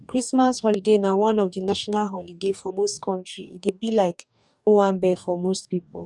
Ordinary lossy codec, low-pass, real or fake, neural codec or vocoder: none; 10.8 kHz; fake; codec, 44.1 kHz, 2.6 kbps, DAC